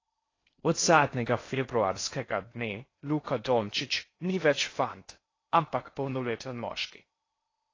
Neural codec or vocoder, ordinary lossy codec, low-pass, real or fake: codec, 16 kHz in and 24 kHz out, 0.6 kbps, FocalCodec, streaming, 4096 codes; AAC, 32 kbps; 7.2 kHz; fake